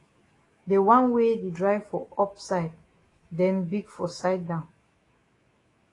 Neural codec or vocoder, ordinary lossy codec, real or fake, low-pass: autoencoder, 48 kHz, 128 numbers a frame, DAC-VAE, trained on Japanese speech; AAC, 32 kbps; fake; 10.8 kHz